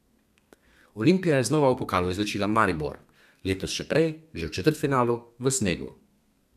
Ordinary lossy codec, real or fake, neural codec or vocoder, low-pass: none; fake; codec, 32 kHz, 1.9 kbps, SNAC; 14.4 kHz